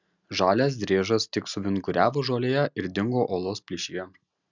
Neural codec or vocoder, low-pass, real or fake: none; 7.2 kHz; real